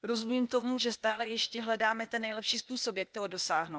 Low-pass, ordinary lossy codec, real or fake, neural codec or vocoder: none; none; fake; codec, 16 kHz, 0.8 kbps, ZipCodec